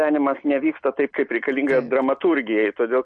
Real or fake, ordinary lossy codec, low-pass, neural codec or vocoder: real; MP3, 48 kbps; 9.9 kHz; none